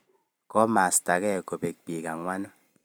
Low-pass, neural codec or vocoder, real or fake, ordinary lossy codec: none; vocoder, 44.1 kHz, 128 mel bands every 256 samples, BigVGAN v2; fake; none